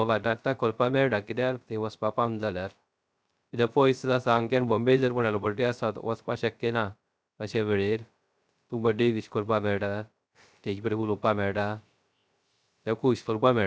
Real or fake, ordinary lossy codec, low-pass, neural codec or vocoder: fake; none; none; codec, 16 kHz, 0.3 kbps, FocalCodec